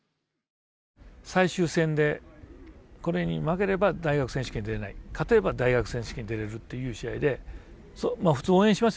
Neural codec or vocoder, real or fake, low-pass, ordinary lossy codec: none; real; none; none